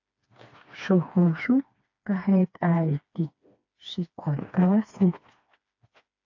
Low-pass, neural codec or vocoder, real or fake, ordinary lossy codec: 7.2 kHz; codec, 16 kHz, 2 kbps, FreqCodec, smaller model; fake; AAC, 48 kbps